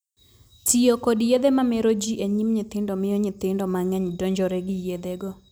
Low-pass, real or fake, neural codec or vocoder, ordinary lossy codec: none; real; none; none